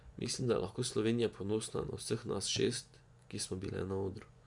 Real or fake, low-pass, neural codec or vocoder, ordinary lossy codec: fake; 10.8 kHz; vocoder, 24 kHz, 100 mel bands, Vocos; none